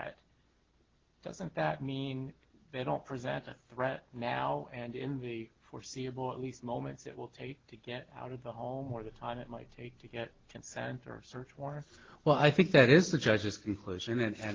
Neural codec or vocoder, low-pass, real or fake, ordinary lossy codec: none; 7.2 kHz; real; Opus, 16 kbps